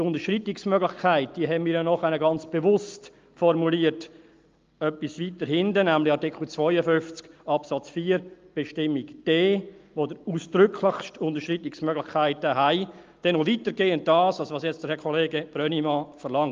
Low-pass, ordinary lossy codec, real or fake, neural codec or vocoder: 7.2 kHz; Opus, 32 kbps; real; none